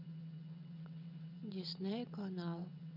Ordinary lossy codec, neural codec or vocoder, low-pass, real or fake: none; vocoder, 22.05 kHz, 80 mel bands, WaveNeXt; 5.4 kHz; fake